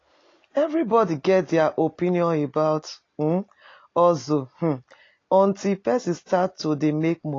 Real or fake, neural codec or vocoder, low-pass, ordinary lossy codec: real; none; 7.2 kHz; AAC, 32 kbps